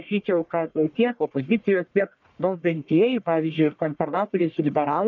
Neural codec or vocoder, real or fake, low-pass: codec, 44.1 kHz, 1.7 kbps, Pupu-Codec; fake; 7.2 kHz